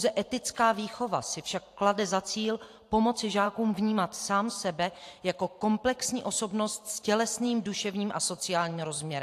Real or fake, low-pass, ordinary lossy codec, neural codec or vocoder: fake; 14.4 kHz; AAC, 64 kbps; vocoder, 44.1 kHz, 128 mel bands every 512 samples, BigVGAN v2